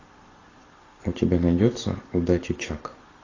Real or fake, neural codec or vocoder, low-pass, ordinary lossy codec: real; none; 7.2 kHz; AAC, 32 kbps